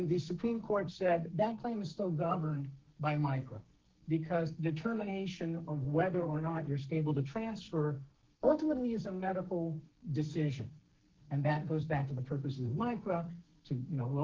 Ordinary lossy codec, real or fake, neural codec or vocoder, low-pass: Opus, 16 kbps; fake; codec, 44.1 kHz, 2.6 kbps, SNAC; 7.2 kHz